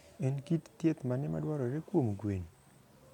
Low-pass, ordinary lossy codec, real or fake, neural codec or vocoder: 19.8 kHz; none; real; none